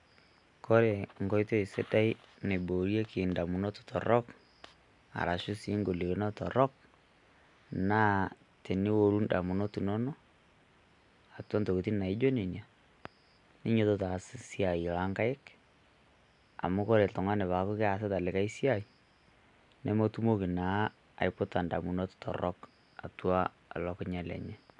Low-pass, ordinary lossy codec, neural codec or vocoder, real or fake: 10.8 kHz; none; none; real